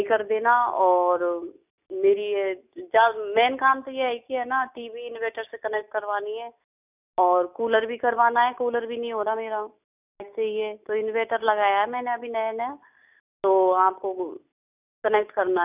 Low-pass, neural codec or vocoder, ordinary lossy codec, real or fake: 3.6 kHz; none; none; real